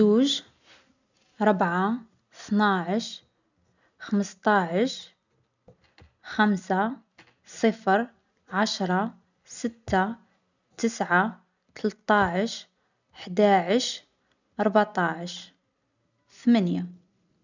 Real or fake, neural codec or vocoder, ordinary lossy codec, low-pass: real; none; none; 7.2 kHz